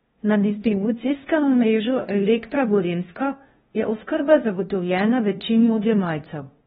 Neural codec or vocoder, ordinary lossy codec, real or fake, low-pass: codec, 16 kHz, 0.5 kbps, FunCodec, trained on LibriTTS, 25 frames a second; AAC, 16 kbps; fake; 7.2 kHz